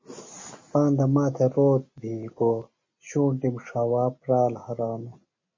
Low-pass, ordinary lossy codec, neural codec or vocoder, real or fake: 7.2 kHz; MP3, 32 kbps; none; real